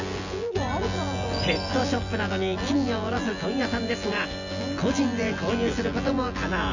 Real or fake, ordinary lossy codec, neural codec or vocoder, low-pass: fake; Opus, 64 kbps; vocoder, 24 kHz, 100 mel bands, Vocos; 7.2 kHz